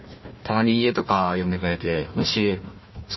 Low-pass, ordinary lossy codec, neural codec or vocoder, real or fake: 7.2 kHz; MP3, 24 kbps; codec, 16 kHz, 1 kbps, FunCodec, trained on Chinese and English, 50 frames a second; fake